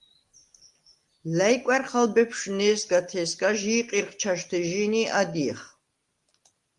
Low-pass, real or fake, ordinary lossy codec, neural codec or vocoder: 10.8 kHz; real; Opus, 24 kbps; none